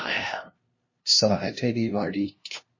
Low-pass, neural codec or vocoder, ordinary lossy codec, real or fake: 7.2 kHz; codec, 16 kHz, 0.5 kbps, FunCodec, trained on LibriTTS, 25 frames a second; MP3, 32 kbps; fake